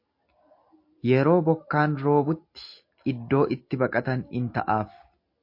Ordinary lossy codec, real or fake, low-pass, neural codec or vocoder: MP3, 32 kbps; real; 5.4 kHz; none